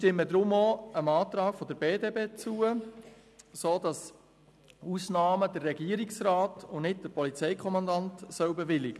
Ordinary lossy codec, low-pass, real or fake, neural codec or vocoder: none; none; real; none